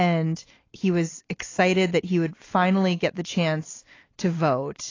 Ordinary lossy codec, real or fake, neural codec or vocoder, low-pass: AAC, 32 kbps; real; none; 7.2 kHz